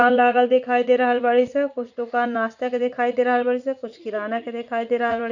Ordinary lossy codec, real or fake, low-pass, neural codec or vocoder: none; fake; 7.2 kHz; vocoder, 44.1 kHz, 80 mel bands, Vocos